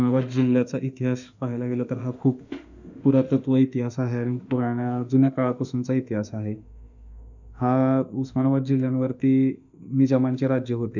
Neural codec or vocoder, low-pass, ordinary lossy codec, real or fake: autoencoder, 48 kHz, 32 numbers a frame, DAC-VAE, trained on Japanese speech; 7.2 kHz; none; fake